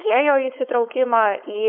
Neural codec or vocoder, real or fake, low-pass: codec, 16 kHz, 4.8 kbps, FACodec; fake; 5.4 kHz